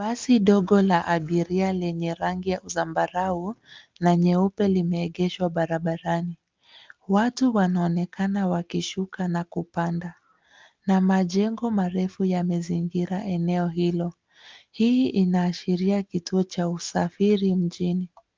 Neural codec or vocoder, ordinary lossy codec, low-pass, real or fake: none; Opus, 24 kbps; 7.2 kHz; real